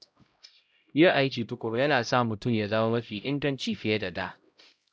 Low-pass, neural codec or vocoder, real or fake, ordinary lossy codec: none; codec, 16 kHz, 0.5 kbps, X-Codec, HuBERT features, trained on LibriSpeech; fake; none